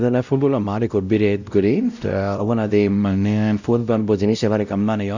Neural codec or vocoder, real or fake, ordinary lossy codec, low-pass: codec, 16 kHz, 0.5 kbps, X-Codec, WavLM features, trained on Multilingual LibriSpeech; fake; none; 7.2 kHz